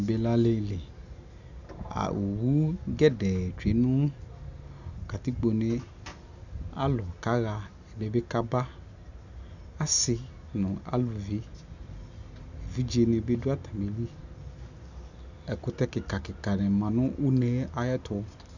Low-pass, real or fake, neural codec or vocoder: 7.2 kHz; real; none